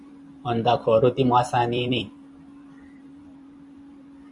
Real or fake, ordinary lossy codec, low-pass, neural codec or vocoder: fake; MP3, 64 kbps; 10.8 kHz; vocoder, 44.1 kHz, 128 mel bands every 256 samples, BigVGAN v2